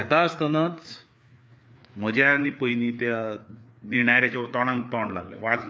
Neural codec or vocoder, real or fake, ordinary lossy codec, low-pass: codec, 16 kHz, 4 kbps, FreqCodec, larger model; fake; none; none